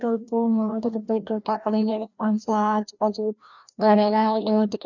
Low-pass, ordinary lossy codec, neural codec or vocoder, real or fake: 7.2 kHz; none; codec, 16 kHz, 1 kbps, FreqCodec, larger model; fake